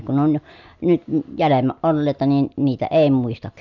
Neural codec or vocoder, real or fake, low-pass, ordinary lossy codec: none; real; 7.2 kHz; none